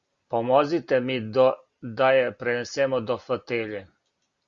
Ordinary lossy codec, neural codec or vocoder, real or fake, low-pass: Opus, 64 kbps; none; real; 7.2 kHz